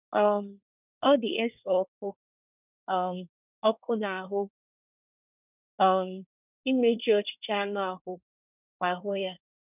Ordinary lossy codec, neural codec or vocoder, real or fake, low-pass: none; codec, 24 kHz, 1 kbps, SNAC; fake; 3.6 kHz